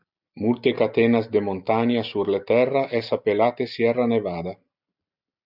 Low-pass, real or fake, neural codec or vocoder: 5.4 kHz; real; none